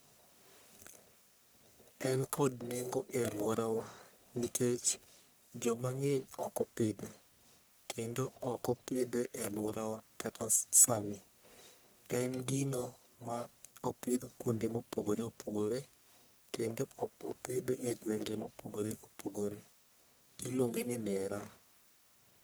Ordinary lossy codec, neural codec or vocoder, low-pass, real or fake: none; codec, 44.1 kHz, 1.7 kbps, Pupu-Codec; none; fake